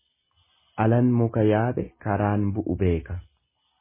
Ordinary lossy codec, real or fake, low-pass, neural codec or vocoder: MP3, 16 kbps; real; 3.6 kHz; none